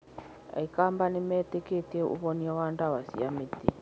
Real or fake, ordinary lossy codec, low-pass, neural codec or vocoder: real; none; none; none